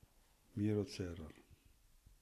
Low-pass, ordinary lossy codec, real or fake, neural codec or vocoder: 14.4 kHz; AAC, 48 kbps; real; none